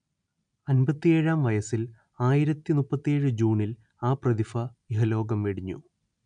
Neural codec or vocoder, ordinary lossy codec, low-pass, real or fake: none; none; 9.9 kHz; real